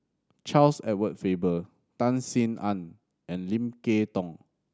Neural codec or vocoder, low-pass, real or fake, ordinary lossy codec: none; none; real; none